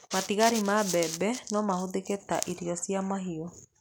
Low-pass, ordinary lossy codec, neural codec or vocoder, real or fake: none; none; none; real